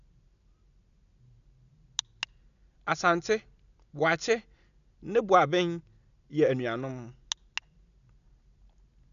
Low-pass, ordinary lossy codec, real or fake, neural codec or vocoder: 7.2 kHz; none; real; none